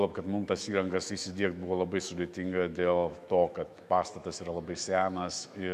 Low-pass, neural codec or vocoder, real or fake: 14.4 kHz; autoencoder, 48 kHz, 128 numbers a frame, DAC-VAE, trained on Japanese speech; fake